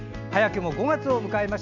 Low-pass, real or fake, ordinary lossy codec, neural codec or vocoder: 7.2 kHz; real; none; none